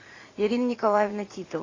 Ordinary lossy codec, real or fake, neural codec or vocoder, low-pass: AAC, 32 kbps; fake; vocoder, 44.1 kHz, 80 mel bands, Vocos; 7.2 kHz